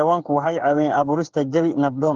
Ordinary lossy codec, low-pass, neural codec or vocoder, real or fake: Opus, 16 kbps; 7.2 kHz; codec, 16 kHz, 8 kbps, FreqCodec, smaller model; fake